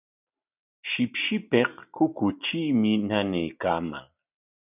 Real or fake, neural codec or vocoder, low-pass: real; none; 3.6 kHz